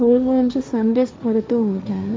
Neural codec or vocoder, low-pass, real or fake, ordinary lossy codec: codec, 16 kHz, 1.1 kbps, Voila-Tokenizer; none; fake; none